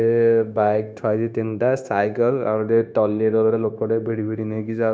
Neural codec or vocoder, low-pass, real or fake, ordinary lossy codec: codec, 16 kHz, 0.9 kbps, LongCat-Audio-Codec; none; fake; none